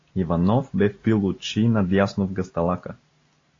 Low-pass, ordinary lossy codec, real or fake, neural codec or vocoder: 7.2 kHz; AAC, 32 kbps; real; none